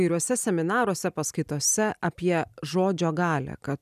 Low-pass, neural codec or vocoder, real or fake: 14.4 kHz; none; real